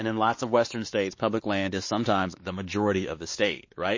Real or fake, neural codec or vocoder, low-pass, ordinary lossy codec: fake; codec, 16 kHz, 2 kbps, X-Codec, WavLM features, trained on Multilingual LibriSpeech; 7.2 kHz; MP3, 32 kbps